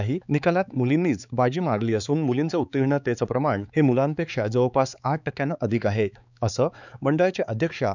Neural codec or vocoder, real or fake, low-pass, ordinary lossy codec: codec, 16 kHz, 4 kbps, X-Codec, HuBERT features, trained on balanced general audio; fake; 7.2 kHz; none